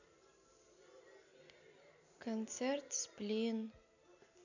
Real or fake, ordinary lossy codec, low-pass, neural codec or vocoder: real; none; 7.2 kHz; none